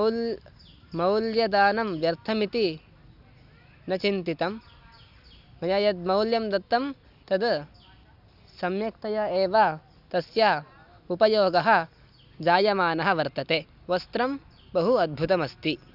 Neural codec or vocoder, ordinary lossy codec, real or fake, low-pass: none; Opus, 64 kbps; real; 5.4 kHz